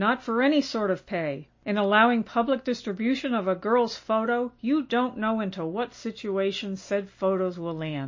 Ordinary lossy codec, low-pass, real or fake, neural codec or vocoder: MP3, 32 kbps; 7.2 kHz; real; none